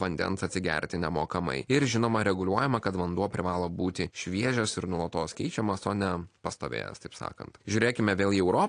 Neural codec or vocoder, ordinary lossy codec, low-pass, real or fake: none; AAC, 48 kbps; 9.9 kHz; real